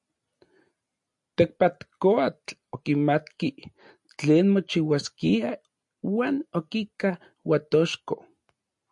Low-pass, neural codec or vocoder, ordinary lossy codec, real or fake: 10.8 kHz; none; MP3, 64 kbps; real